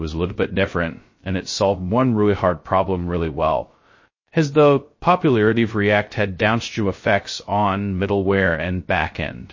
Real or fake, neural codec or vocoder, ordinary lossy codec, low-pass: fake; codec, 16 kHz, 0.2 kbps, FocalCodec; MP3, 32 kbps; 7.2 kHz